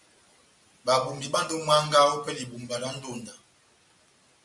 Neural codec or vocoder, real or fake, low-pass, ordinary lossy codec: none; real; 10.8 kHz; MP3, 64 kbps